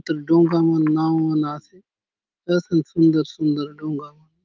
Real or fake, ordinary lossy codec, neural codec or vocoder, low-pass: fake; Opus, 32 kbps; autoencoder, 48 kHz, 128 numbers a frame, DAC-VAE, trained on Japanese speech; 7.2 kHz